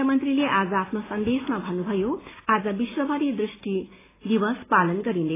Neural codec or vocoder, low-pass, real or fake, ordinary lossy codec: none; 3.6 kHz; real; AAC, 16 kbps